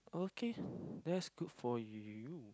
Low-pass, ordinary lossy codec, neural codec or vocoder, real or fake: none; none; none; real